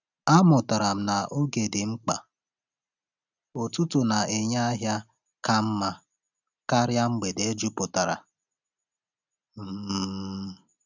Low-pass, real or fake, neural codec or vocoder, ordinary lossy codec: 7.2 kHz; real; none; none